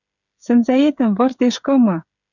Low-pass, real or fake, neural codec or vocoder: 7.2 kHz; fake; codec, 16 kHz, 16 kbps, FreqCodec, smaller model